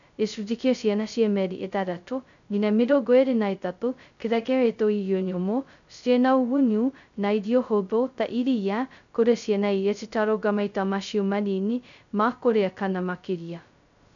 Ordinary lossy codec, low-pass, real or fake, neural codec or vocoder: none; 7.2 kHz; fake; codec, 16 kHz, 0.2 kbps, FocalCodec